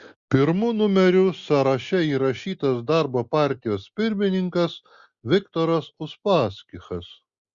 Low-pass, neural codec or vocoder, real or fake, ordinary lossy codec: 7.2 kHz; none; real; Opus, 64 kbps